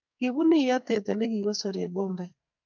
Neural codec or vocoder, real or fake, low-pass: codec, 44.1 kHz, 2.6 kbps, SNAC; fake; 7.2 kHz